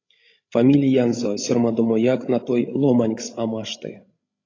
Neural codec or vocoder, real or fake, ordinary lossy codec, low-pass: codec, 16 kHz, 16 kbps, FreqCodec, larger model; fake; AAC, 32 kbps; 7.2 kHz